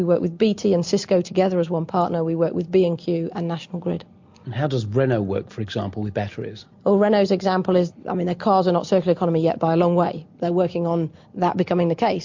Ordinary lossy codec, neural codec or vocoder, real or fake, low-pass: MP3, 48 kbps; none; real; 7.2 kHz